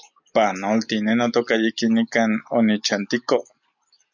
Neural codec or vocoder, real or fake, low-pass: none; real; 7.2 kHz